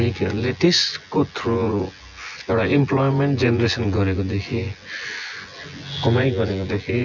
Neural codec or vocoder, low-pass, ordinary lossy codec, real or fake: vocoder, 24 kHz, 100 mel bands, Vocos; 7.2 kHz; none; fake